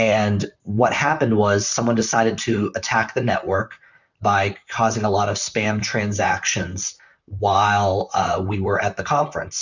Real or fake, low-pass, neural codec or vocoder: fake; 7.2 kHz; vocoder, 44.1 kHz, 128 mel bands, Pupu-Vocoder